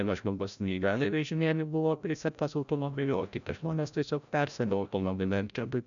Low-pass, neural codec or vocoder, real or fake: 7.2 kHz; codec, 16 kHz, 0.5 kbps, FreqCodec, larger model; fake